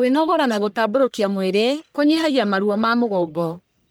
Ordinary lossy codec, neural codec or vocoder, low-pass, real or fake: none; codec, 44.1 kHz, 1.7 kbps, Pupu-Codec; none; fake